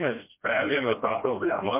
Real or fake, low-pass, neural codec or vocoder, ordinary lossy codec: fake; 3.6 kHz; codec, 16 kHz, 1 kbps, FreqCodec, smaller model; MP3, 32 kbps